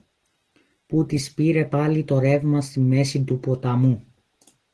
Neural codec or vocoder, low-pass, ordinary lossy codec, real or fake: none; 10.8 kHz; Opus, 16 kbps; real